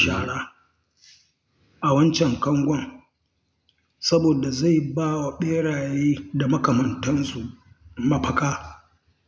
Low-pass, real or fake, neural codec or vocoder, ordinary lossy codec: none; real; none; none